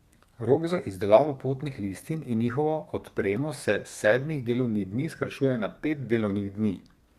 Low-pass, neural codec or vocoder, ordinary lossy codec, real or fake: 14.4 kHz; codec, 32 kHz, 1.9 kbps, SNAC; Opus, 64 kbps; fake